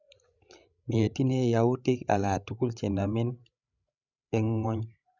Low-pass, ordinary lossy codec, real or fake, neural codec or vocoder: 7.2 kHz; none; fake; codec, 16 kHz, 8 kbps, FreqCodec, larger model